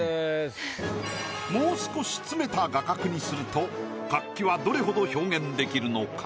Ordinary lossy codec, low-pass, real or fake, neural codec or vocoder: none; none; real; none